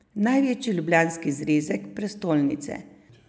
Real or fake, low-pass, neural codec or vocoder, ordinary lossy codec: real; none; none; none